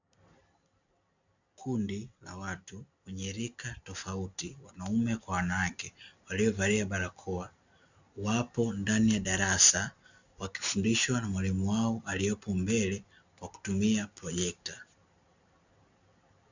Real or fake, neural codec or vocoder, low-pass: real; none; 7.2 kHz